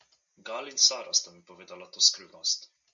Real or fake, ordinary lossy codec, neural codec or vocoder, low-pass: real; MP3, 64 kbps; none; 7.2 kHz